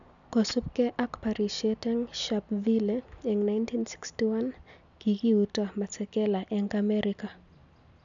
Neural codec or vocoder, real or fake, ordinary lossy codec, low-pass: none; real; none; 7.2 kHz